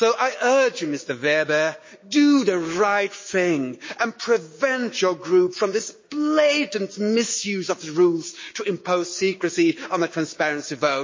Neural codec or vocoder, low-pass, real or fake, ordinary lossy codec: codec, 16 kHz, 6 kbps, DAC; 7.2 kHz; fake; MP3, 32 kbps